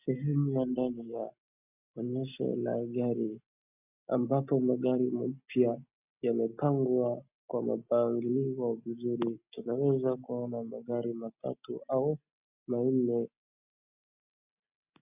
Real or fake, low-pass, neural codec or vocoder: real; 3.6 kHz; none